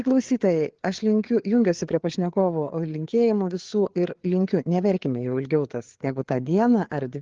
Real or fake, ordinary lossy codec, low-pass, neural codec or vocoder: fake; Opus, 16 kbps; 7.2 kHz; codec, 16 kHz, 4 kbps, FreqCodec, larger model